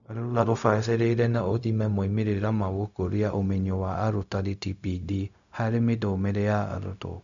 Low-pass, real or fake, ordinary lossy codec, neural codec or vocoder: 7.2 kHz; fake; none; codec, 16 kHz, 0.4 kbps, LongCat-Audio-Codec